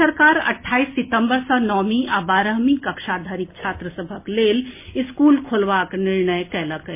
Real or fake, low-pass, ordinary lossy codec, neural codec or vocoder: real; 3.6 kHz; MP3, 24 kbps; none